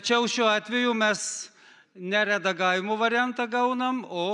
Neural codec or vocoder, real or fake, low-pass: none; real; 10.8 kHz